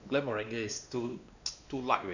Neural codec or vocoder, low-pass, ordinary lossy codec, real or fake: codec, 16 kHz, 2 kbps, X-Codec, WavLM features, trained on Multilingual LibriSpeech; 7.2 kHz; none; fake